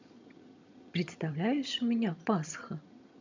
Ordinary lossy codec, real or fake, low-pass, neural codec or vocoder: MP3, 64 kbps; fake; 7.2 kHz; vocoder, 22.05 kHz, 80 mel bands, HiFi-GAN